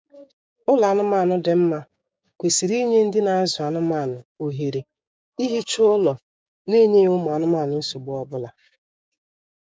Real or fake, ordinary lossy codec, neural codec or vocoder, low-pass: fake; none; codec, 16 kHz, 6 kbps, DAC; none